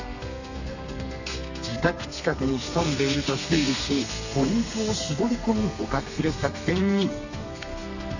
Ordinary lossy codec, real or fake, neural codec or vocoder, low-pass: none; fake; codec, 32 kHz, 1.9 kbps, SNAC; 7.2 kHz